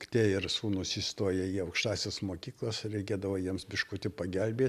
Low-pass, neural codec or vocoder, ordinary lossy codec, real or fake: 14.4 kHz; none; AAC, 96 kbps; real